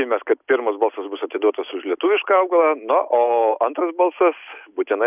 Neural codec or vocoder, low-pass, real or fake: none; 3.6 kHz; real